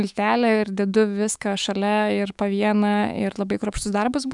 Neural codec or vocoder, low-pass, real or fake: autoencoder, 48 kHz, 128 numbers a frame, DAC-VAE, trained on Japanese speech; 10.8 kHz; fake